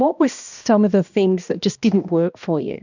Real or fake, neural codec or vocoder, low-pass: fake; codec, 16 kHz, 1 kbps, X-Codec, HuBERT features, trained on balanced general audio; 7.2 kHz